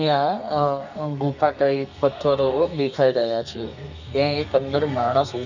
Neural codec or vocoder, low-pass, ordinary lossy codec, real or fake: codec, 32 kHz, 1.9 kbps, SNAC; 7.2 kHz; none; fake